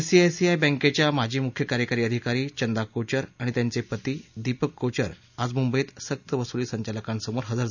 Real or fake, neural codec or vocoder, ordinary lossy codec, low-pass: real; none; none; 7.2 kHz